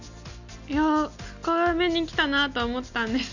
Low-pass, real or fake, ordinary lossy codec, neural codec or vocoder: 7.2 kHz; real; none; none